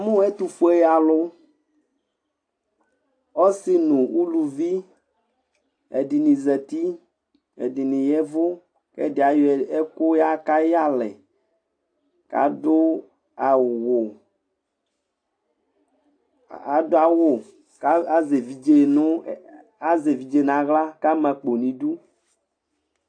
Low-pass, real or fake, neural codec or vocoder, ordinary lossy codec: 9.9 kHz; real; none; AAC, 48 kbps